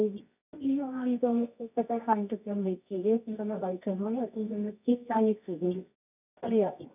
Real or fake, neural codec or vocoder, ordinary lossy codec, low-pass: fake; codec, 24 kHz, 0.9 kbps, WavTokenizer, medium music audio release; none; 3.6 kHz